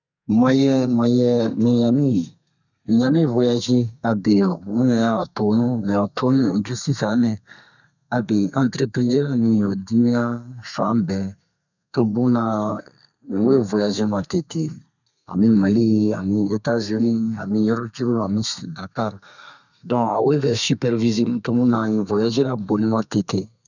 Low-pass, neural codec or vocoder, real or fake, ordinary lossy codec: 7.2 kHz; codec, 32 kHz, 1.9 kbps, SNAC; fake; none